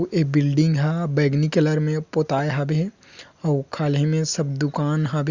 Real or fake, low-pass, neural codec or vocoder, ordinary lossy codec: real; 7.2 kHz; none; Opus, 64 kbps